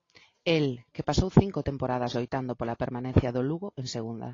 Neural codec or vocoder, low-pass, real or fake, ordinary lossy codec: none; 7.2 kHz; real; AAC, 32 kbps